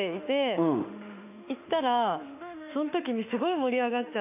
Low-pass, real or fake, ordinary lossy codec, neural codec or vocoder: 3.6 kHz; fake; none; autoencoder, 48 kHz, 32 numbers a frame, DAC-VAE, trained on Japanese speech